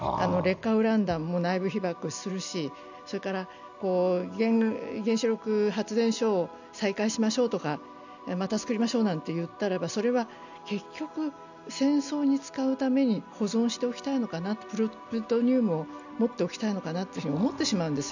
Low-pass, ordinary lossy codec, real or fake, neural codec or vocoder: 7.2 kHz; none; real; none